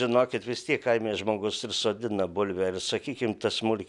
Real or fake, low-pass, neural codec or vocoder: real; 10.8 kHz; none